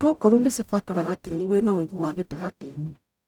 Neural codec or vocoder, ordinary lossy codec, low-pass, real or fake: codec, 44.1 kHz, 0.9 kbps, DAC; none; 19.8 kHz; fake